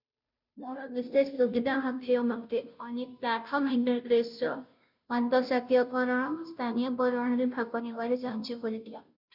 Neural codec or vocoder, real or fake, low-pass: codec, 16 kHz, 0.5 kbps, FunCodec, trained on Chinese and English, 25 frames a second; fake; 5.4 kHz